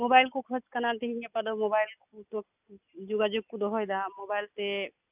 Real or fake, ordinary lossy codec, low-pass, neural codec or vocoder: real; none; 3.6 kHz; none